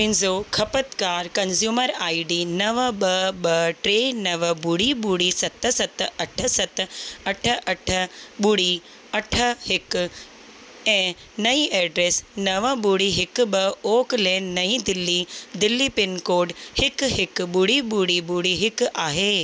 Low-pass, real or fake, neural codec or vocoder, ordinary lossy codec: none; real; none; none